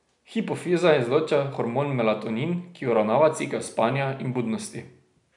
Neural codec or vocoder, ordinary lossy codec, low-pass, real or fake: none; none; 10.8 kHz; real